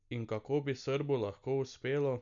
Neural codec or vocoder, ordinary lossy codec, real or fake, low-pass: none; none; real; 7.2 kHz